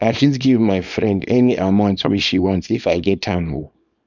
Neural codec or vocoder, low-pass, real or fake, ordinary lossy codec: codec, 24 kHz, 0.9 kbps, WavTokenizer, small release; 7.2 kHz; fake; none